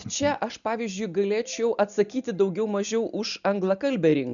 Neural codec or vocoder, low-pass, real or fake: none; 7.2 kHz; real